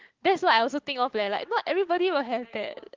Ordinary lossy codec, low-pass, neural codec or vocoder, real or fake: Opus, 16 kbps; 7.2 kHz; codec, 16 kHz, 6 kbps, DAC; fake